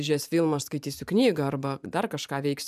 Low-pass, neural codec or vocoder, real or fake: 14.4 kHz; vocoder, 44.1 kHz, 128 mel bands every 512 samples, BigVGAN v2; fake